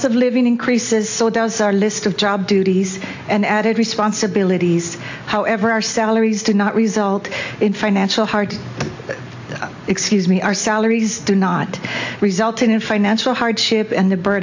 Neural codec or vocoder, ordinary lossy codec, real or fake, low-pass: none; AAC, 48 kbps; real; 7.2 kHz